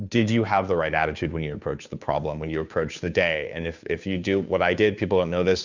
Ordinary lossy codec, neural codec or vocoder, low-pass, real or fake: Opus, 64 kbps; codec, 16 kHz, 2 kbps, FunCodec, trained on Chinese and English, 25 frames a second; 7.2 kHz; fake